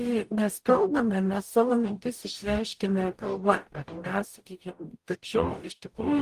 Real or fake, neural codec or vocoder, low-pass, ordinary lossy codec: fake; codec, 44.1 kHz, 0.9 kbps, DAC; 14.4 kHz; Opus, 24 kbps